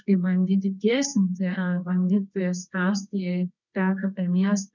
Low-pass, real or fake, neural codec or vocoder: 7.2 kHz; fake; codec, 24 kHz, 0.9 kbps, WavTokenizer, medium music audio release